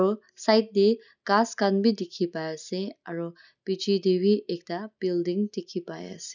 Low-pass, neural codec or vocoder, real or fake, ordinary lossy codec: 7.2 kHz; none; real; none